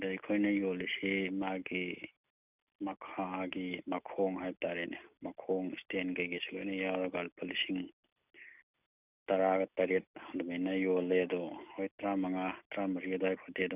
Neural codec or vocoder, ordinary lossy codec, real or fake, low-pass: none; none; real; 3.6 kHz